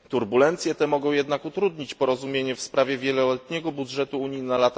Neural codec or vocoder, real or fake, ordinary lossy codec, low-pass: none; real; none; none